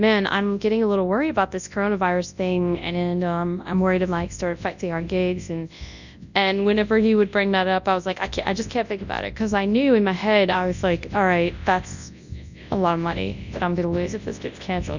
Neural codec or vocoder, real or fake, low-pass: codec, 24 kHz, 0.9 kbps, WavTokenizer, large speech release; fake; 7.2 kHz